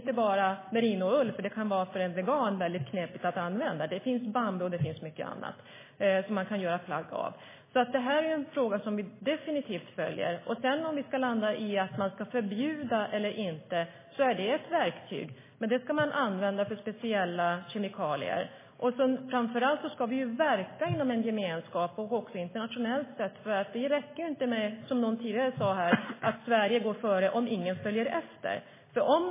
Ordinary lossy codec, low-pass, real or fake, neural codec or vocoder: MP3, 16 kbps; 3.6 kHz; real; none